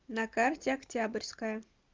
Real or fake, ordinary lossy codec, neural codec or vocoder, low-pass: real; Opus, 32 kbps; none; 7.2 kHz